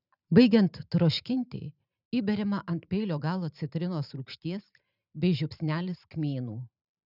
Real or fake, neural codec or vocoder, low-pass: fake; vocoder, 44.1 kHz, 128 mel bands every 512 samples, BigVGAN v2; 5.4 kHz